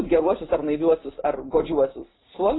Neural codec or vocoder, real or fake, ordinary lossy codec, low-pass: none; real; AAC, 16 kbps; 7.2 kHz